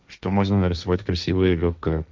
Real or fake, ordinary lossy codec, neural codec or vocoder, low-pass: fake; none; codec, 16 kHz, 1.1 kbps, Voila-Tokenizer; none